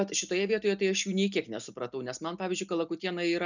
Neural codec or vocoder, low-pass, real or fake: none; 7.2 kHz; real